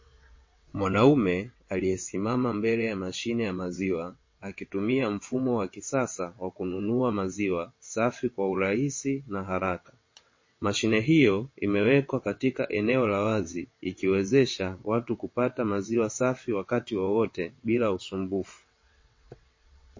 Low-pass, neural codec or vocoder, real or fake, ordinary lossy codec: 7.2 kHz; vocoder, 22.05 kHz, 80 mel bands, WaveNeXt; fake; MP3, 32 kbps